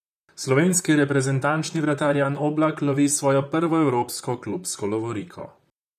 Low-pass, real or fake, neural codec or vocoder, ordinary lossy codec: 14.4 kHz; fake; vocoder, 44.1 kHz, 128 mel bands, Pupu-Vocoder; none